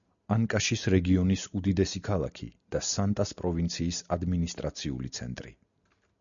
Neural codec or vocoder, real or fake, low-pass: none; real; 7.2 kHz